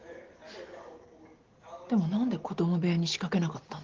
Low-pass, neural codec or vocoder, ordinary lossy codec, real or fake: 7.2 kHz; none; Opus, 16 kbps; real